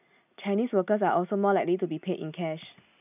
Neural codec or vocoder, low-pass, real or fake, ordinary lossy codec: none; 3.6 kHz; real; none